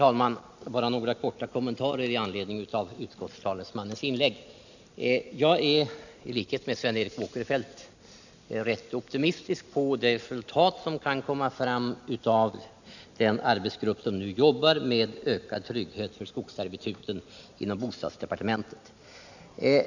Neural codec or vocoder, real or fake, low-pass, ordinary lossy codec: none; real; 7.2 kHz; none